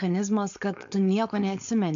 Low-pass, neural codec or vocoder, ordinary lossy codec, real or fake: 7.2 kHz; codec, 16 kHz, 4.8 kbps, FACodec; AAC, 64 kbps; fake